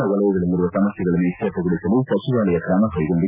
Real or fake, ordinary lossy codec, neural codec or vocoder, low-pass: real; none; none; 3.6 kHz